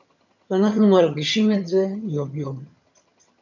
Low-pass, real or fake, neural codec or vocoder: 7.2 kHz; fake; vocoder, 22.05 kHz, 80 mel bands, HiFi-GAN